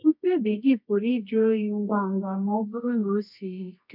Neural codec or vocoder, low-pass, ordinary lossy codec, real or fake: codec, 24 kHz, 0.9 kbps, WavTokenizer, medium music audio release; 5.4 kHz; none; fake